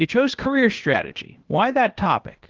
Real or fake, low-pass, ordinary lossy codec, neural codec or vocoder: fake; 7.2 kHz; Opus, 16 kbps; codec, 16 kHz, 0.8 kbps, ZipCodec